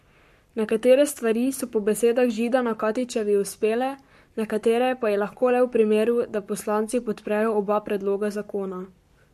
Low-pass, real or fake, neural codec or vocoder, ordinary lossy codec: 14.4 kHz; fake; codec, 44.1 kHz, 7.8 kbps, Pupu-Codec; MP3, 64 kbps